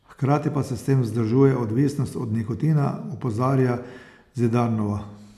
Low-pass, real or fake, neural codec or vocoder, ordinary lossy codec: 14.4 kHz; real; none; none